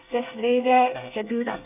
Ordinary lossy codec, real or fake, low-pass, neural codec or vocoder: none; fake; 3.6 kHz; codec, 24 kHz, 1 kbps, SNAC